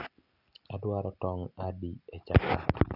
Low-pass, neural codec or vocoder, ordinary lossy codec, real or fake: 5.4 kHz; none; none; real